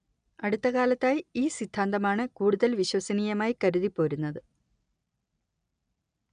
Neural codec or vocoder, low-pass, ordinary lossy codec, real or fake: none; 9.9 kHz; none; real